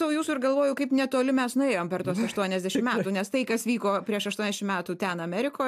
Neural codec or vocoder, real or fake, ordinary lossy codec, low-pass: none; real; AAC, 96 kbps; 14.4 kHz